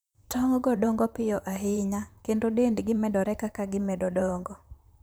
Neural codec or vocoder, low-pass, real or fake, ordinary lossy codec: vocoder, 44.1 kHz, 128 mel bands, Pupu-Vocoder; none; fake; none